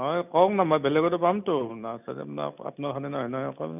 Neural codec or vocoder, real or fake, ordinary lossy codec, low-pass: none; real; none; 3.6 kHz